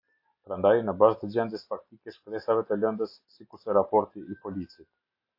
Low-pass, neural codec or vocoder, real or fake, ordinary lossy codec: 5.4 kHz; none; real; AAC, 48 kbps